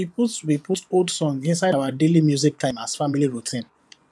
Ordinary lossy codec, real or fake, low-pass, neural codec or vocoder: none; fake; none; vocoder, 24 kHz, 100 mel bands, Vocos